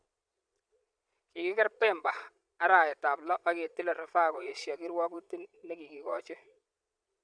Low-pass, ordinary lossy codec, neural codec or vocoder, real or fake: 9.9 kHz; none; vocoder, 22.05 kHz, 80 mel bands, Vocos; fake